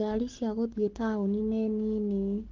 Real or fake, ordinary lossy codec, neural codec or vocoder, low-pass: fake; Opus, 16 kbps; codec, 44.1 kHz, 7.8 kbps, Pupu-Codec; 7.2 kHz